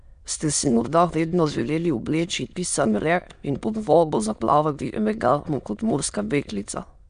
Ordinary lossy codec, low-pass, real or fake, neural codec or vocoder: AAC, 96 kbps; 9.9 kHz; fake; autoencoder, 22.05 kHz, a latent of 192 numbers a frame, VITS, trained on many speakers